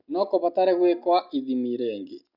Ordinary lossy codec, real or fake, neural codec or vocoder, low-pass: none; real; none; 5.4 kHz